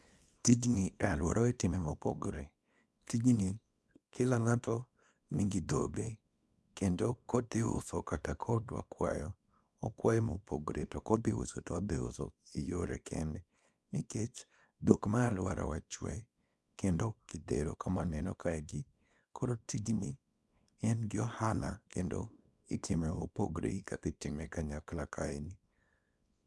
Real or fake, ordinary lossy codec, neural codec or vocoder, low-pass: fake; none; codec, 24 kHz, 0.9 kbps, WavTokenizer, small release; none